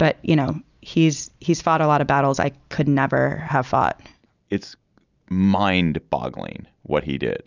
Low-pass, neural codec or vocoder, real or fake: 7.2 kHz; none; real